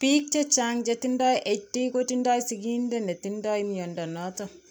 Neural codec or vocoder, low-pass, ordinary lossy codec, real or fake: vocoder, 44.1 kHz, 128 mel bands every 256 samples, BigVGAN v2; 19.8 kHz; none; fake